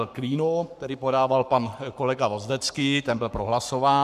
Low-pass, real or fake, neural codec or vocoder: 14.4 kHz; fake; codec, 44.1 kHz, 7.8 kbps, Pupu-Codec